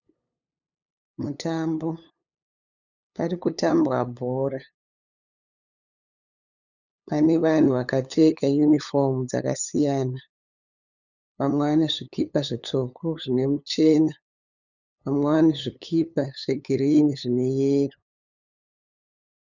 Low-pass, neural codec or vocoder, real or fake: 7.2 kHz; codec, 16 kHz, 8 kbps, FunCodec, trained on LibriTTS, 25 frames a second; fake